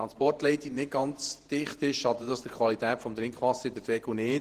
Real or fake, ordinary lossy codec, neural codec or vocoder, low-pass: fake; Opus, 16 kbps; vocoder, 48 kHz, 128 mel bands, Vocos; 14.4 kHz